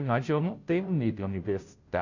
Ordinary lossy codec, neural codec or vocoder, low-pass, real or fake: none; codec, 16 kHz, 0.5 kbps, FunCodec, trained on Chinese and English, 25 frames a second; 7.2 kHz; fake